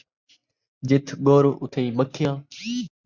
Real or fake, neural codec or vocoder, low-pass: real; none; 7.2 kHz